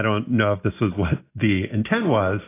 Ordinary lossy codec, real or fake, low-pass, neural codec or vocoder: AAC, 16 kbps; real; 3.6 kHz; none